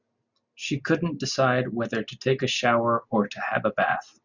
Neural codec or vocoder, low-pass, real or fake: none; 7.2 kHz; real